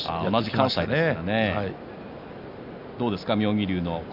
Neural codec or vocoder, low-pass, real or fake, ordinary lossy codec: none; 5.4 kHz; real; none